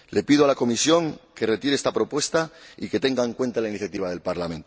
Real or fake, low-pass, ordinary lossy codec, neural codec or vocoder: real; none; none; none